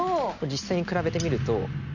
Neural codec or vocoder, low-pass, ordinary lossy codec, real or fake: none; 7.2 kHz; none; real